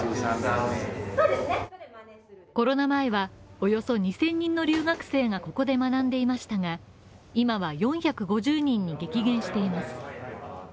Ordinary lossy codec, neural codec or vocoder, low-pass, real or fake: none; none; none; real